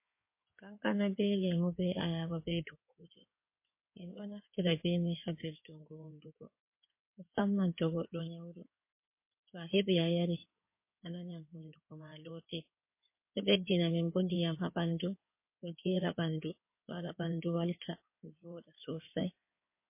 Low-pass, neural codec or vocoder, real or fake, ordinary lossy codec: 3.6 kHz; codec, 16 kHz in and 24 kHz out, 2.2 kbps, FireRedTTS-2 codec; fake; MP3, 24 kbps